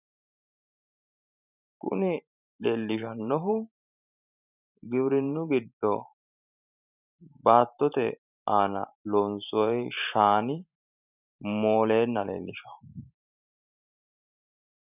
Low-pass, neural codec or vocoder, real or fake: 3.6 kHz; vocoder, 44.1 kHz, 128 mel bands every 512 samples, BigVGAN v2; fake